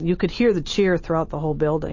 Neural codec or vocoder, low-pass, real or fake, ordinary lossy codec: none; 7.2 kHz; real; MP3, 32 kbps